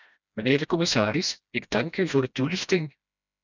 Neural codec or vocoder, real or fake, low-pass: codec, 16 kHz, 1 kbps, FreqCodec, smaller model; fake; 7.2 kHz